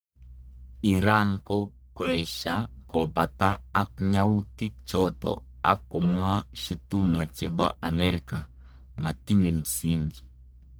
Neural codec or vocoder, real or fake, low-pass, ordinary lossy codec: codec, 44.1 kHz, 1.7 kbps, Pupu-Codec; fake; none; none